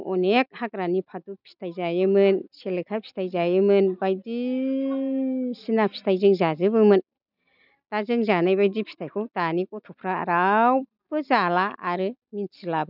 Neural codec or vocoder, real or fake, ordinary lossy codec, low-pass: none; real; none; 5.4 kHz